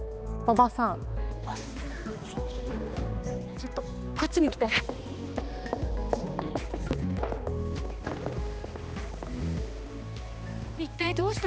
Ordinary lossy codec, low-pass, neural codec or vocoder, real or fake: none; none; codec, 16 kHz, 2 kbps, X-Codec, HuBERT features, trained on balanced general audio; fake